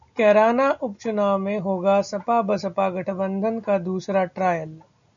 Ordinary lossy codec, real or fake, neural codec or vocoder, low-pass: MP3, 96 kbps; real; none; 7.2 kHz